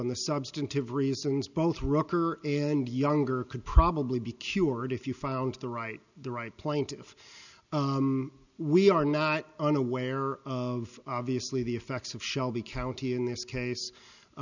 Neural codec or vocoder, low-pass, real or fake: none; 7.2 kHz; real